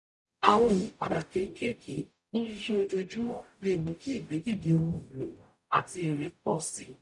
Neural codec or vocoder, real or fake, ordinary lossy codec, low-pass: codec, 44.1 kHz, 0.9 kbps, DAC; fake; none; 10.8 kHz